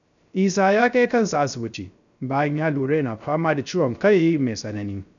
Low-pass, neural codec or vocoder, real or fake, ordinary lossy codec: 7.2 kHz; codec, 16 kHz, 0.3 kbps, FocalCodec; fake; none